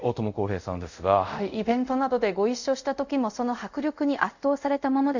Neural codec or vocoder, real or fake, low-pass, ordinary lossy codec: codec, 24 kHz, 0.5 kbps, DualCodec; fake; 7.2 kHz; none